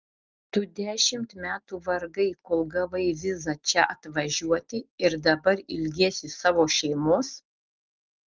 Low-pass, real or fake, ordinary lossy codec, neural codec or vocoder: 7.2 kHz; real; Opus, 32 kbps; none